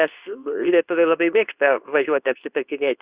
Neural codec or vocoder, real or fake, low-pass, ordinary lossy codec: codec, 24 kHz, 0.9 kbps, WavTokenizer, medium speech release version 2; fake; 3.6 kHz; Opus, 64 kbps